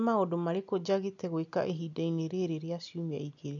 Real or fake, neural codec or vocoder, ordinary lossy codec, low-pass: real; none; none; 7.2 kHz